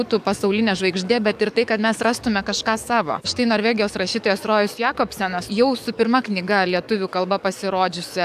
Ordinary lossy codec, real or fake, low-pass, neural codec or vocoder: AAC, 96 kbps; fake; 14.4 kHz; codec, 44.1 kHz, 7.8 kbps, DAC